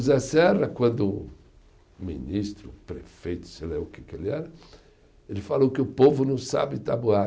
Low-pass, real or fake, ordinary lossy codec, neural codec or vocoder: none; real; none; none